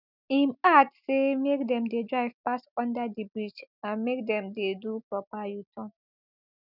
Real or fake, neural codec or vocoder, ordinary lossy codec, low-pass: real; none; none; 5.4 kHz